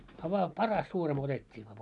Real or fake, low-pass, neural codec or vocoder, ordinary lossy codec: real; 10.8 kHz; none; MP3, 96 kbps